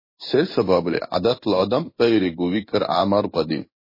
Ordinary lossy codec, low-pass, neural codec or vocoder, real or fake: MP3, 24 kbps; 5.4 kHz; codec, 16 kHz in and 24 kHz out, 1 kbps, XY-Tokenizer; fake